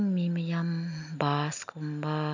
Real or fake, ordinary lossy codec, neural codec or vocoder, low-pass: real; none; none; 7.2 kHz